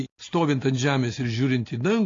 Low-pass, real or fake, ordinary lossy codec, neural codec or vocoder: 7.2 kHz; real; AAC, 32 kbps; none